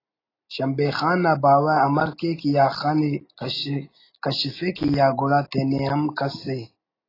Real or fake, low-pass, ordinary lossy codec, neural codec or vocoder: real; 5.4 kHz; AAC, 24 kbps; none